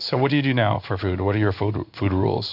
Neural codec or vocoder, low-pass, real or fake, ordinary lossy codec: codec, 16 kHz in and 24 kHz out, 1 kbps, XY-Tokenizer; 5.4 kHz; fake; AAC, 48 kbps